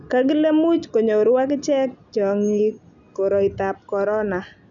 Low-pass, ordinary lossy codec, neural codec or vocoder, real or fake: 7.2 kHz; none; none; real